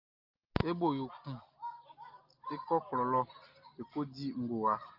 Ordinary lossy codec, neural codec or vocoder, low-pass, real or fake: Opus, 24 kbps; none; 5.4 kHz; real